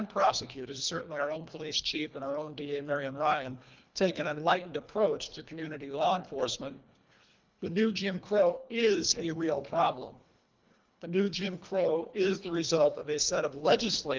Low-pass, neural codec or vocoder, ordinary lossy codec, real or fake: 7.2 kHz; codec, 24 kHz, 1.5 kbps, HILCodec; Opus, 24 kbps; fake